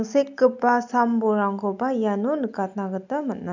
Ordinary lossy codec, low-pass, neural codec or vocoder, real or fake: none; 7.2 kHz; none; real